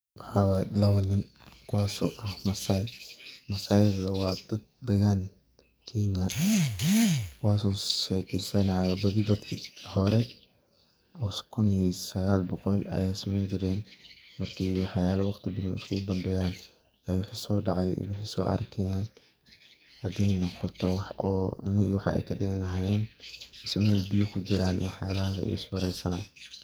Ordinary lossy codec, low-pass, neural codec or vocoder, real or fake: none; none; codec, 44.1 kHz, 2.6 kbps, SNAC; fake